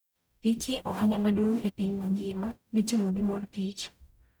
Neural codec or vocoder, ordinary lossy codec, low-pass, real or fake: codec, 44.1 kHz, 0.9 kbps, DAC; none; none; fake